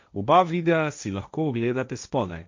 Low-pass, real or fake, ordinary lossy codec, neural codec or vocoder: 7.2 kHz; fake; MP3, 64 kbps; codec, 16 kHz, 1.1 kbps, Voila-Tokenizer